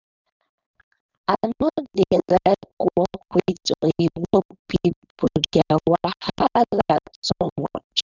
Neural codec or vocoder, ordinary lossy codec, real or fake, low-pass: codec, 16 kHz, 4.8 kbps, FACodec; none; fake; 7.2 kHz